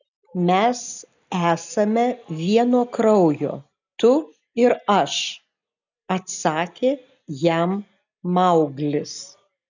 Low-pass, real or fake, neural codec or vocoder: 7.2 kHz; real; none